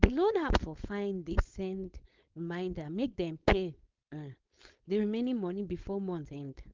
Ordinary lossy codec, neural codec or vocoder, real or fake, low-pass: Opus, 32 kbps; codec, 16 kHz, 4.8 kbps, FACodec; fake; 7.2 kHz